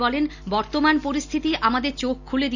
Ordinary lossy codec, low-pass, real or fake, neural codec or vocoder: none; 7.2 kHz; real; none